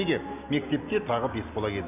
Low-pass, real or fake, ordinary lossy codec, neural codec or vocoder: 3.6 kHz; real; none; none